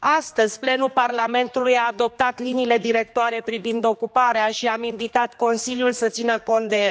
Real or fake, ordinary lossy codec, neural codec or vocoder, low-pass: fake; none; codec, 16 kHz, 2 kbps, X-Codec, HuBERT features, trained on general audio; none